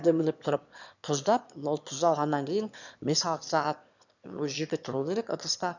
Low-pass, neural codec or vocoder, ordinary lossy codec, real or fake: 7.2 kHz; autoencoder, 22.05 kHz, a latent of 192 numbers a frame, VITS, trained on one speaker; none; fake